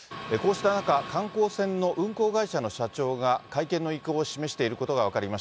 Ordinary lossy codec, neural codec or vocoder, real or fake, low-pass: none; none; real; none